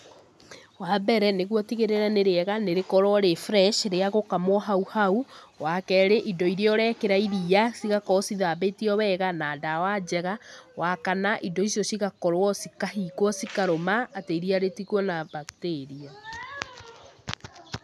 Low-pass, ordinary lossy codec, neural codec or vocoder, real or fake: none; none; none; real